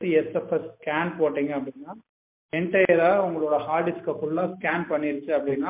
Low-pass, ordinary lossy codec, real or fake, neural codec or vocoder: 3.6 kHz; MP3, 32 kbps; real; none